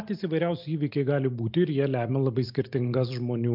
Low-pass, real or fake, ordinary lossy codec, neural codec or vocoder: 5.4 kHz; real; MP3, 48 kbps; none